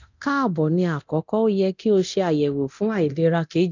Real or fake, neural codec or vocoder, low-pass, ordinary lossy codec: fake; codec, 24 kHz, 0.9 kbps, DualCodec; 7.2 kHz; none